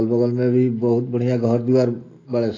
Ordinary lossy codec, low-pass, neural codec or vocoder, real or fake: AAC, 32 kbps; 7.2 kHz; none; real